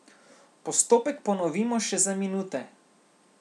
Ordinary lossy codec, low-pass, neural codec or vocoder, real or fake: none; none; none; real